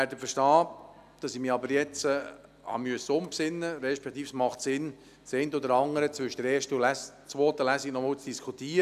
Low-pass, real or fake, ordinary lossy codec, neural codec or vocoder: 14.4 kHz; real; none; none